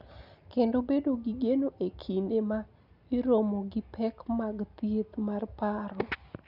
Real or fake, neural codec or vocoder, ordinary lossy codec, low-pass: real; none; none; 5.4 kHz